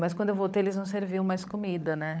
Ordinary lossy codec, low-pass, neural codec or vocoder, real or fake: none; none; codec, 16 kHz, 16 kbps, FunCodec, trained on LibriTTS, 50 frames a second; fake